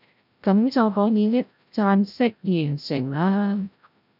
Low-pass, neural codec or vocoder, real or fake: 5.4 kHz; codec, 16 kHz, 0.5 kbps, FreqCodec, larger model; fake